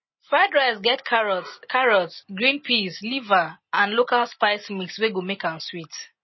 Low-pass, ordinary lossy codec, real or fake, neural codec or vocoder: 7.2 kHz; MP3, 24 kbps; real; none